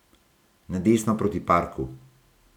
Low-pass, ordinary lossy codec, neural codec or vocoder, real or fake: 19.8 kHz; none; none; real